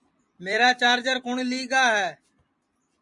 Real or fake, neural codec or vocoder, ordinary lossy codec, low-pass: fake; vocoder, 44.1 kHz, 128 mel bands every 256 samples, BigVGAN v2; MP3, 48 kbps; 10.8 kHz